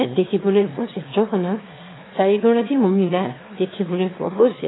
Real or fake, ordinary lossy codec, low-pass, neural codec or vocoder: fake; AAC, 16 kbps; 7.2 kHz; autoencoder, 22.05 kHz, a latent of 192 numbers a frame, VITS, trained on one speaker